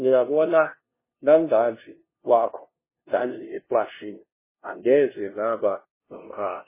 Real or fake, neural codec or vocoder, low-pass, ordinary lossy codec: fake; codec, 16 kHz, 0.5 kbps, FunCodec, trained on LibriTTS, 25 frames a second; 3.6 kHz; MP3, 16 kbps